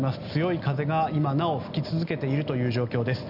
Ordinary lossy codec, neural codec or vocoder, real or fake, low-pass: none; none; real; 5.4 kHz